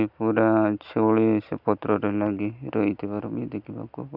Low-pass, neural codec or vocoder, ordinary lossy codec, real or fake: 5.4 kHz; none; none; real